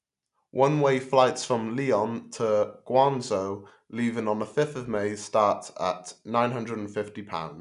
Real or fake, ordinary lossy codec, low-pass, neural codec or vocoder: real; none; 10.8 kHz; none